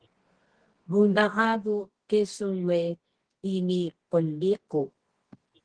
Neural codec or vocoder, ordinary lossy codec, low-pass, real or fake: codec, 24 kHz, 0.9 kbps, WavTokenizer, medium music audio release; Opus, 16 kbps; 9.9 kHz; fake